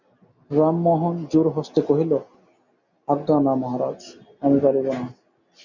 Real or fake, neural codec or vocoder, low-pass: real; none; 7.2 kHz